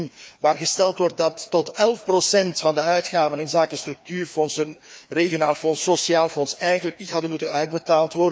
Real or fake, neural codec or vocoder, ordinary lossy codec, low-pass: fake; codec, 16 kHz, 2 kbps, FreqCodec, larger model; none; none